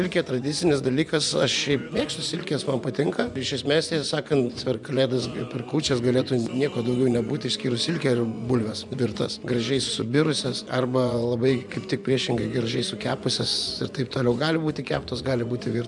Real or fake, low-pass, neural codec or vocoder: fake; 10.8 kHz; vocoder, 24 kHz, 100 mel bands, Vocos